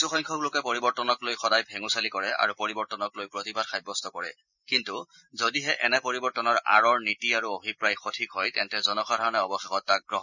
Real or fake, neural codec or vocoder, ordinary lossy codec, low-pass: real; none; none; 7.2 kHz